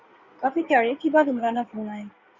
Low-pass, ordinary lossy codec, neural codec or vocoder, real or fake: 7.2 kHz; Opus, 64 kbps; codec, 16 kHz in and 24 kHz out, 2.2 kbps, FireRedTTS-2 codec; fake